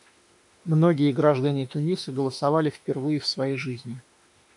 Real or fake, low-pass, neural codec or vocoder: fake; 10.8 kHz; autoencoder, 48 kHz, 32 numbers a frame, DAC-VAE, trained on Japanese speech